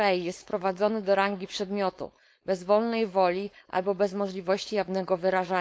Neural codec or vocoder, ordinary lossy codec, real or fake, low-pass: codec, 16 kHz, 4.8 kbps, FACodec; none; fake; none